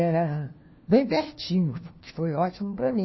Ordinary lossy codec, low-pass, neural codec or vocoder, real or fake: MP3, 24 kbps; 7.2 kHz; codec, 16 kHz, 1 kbps, FunCodec, trained on LibriTTS, 50 frames a second; fake